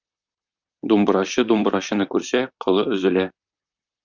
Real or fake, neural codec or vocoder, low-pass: fake; vocoder, 44.1 kHz, 128 mel bands, Pupu-Vocoder; 7.2 kHz